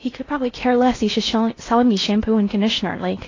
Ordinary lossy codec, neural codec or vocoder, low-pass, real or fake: AAC, 32 kbps; codec, 16 kHz in and 24 kHz out, 0.6 kbps, FocalCodec, streaming, 4096 codes; 7.2 kHz; fake